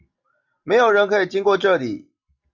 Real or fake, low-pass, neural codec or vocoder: real; 7.2 kHz; none